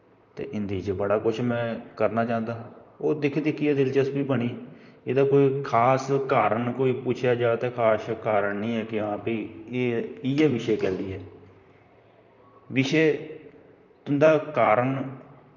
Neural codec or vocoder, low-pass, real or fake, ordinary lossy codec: vocoder, 44.1 kHz, 128 mel bands, Pupu-Vocoder; 7.2 kHz; fake; none